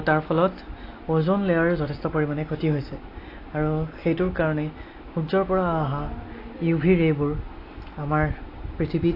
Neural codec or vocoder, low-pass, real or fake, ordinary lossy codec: none; 5.4 kHz; real; AAC, 32 kbps